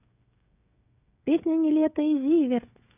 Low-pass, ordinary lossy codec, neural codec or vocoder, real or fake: 3.6 kHz; none; codec, 16 kHz, 16 kbps, FreqCodec, smaller model; fake